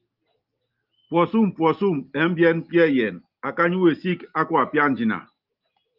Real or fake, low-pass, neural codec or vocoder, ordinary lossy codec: real; 5.4 kHz; none; Opus, 32 kbps